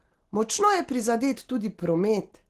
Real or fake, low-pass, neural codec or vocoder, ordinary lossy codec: real; 14.4 kHz; none; Opus, 16 kbps